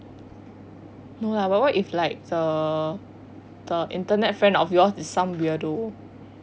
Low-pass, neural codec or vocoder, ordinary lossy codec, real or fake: none; none; none; real